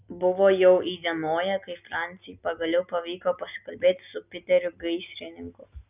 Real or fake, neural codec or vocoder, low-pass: real; none; 3.6 kHz